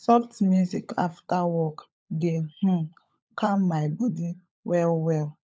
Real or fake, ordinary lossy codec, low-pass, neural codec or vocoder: fake; none; none; codec, 16 kHz, 16 kbps, FunCodec, trained on LibriTTS, 50 frames a second